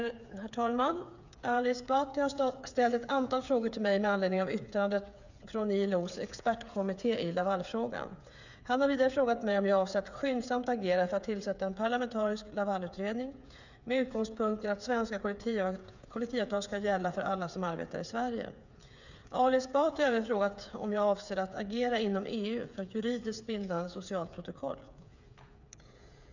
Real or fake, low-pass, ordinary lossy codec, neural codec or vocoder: fake; 7.2 kHz; none; codec, 16 kHz, 8 kbps, FreqCodec, smaller model